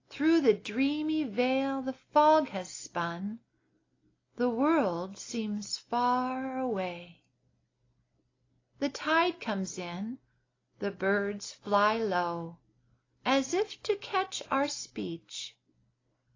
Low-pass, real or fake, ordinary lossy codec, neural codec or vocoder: 7.2 kHz; real; AAC, 32 kbps; none